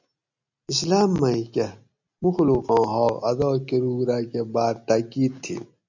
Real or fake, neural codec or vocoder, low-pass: real; none; 7.2 kHz